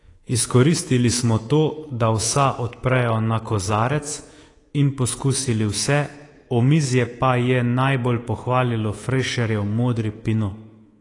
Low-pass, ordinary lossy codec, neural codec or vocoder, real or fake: 10.8 kHz; AAC, 32 kbps; codec, 24 kHz, 3.1 kbps, DualCodec; fake